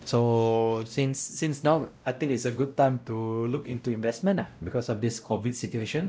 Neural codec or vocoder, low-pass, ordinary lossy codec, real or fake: codec, 16 kHz, 0.5 kbps, X-Codec, WavLM features, trained on Multilingual LibriSpeech; none; none; fake